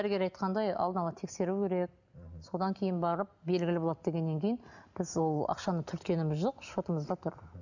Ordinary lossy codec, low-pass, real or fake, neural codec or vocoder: none; 7.2 kHz; real; none